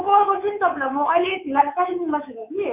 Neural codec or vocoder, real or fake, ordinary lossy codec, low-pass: codec, 24 kHz, 3.1 kbps, DualCodec; fake; none; 3.6 kHz